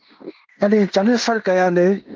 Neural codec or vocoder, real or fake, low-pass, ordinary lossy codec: codec, 16 kHz in and 24 kHz out, 0.9 kbps, LongCat-Audio-Codec, fine tuned four codebook decoder; fake; 7.2 kHz; Opus, 32 kbps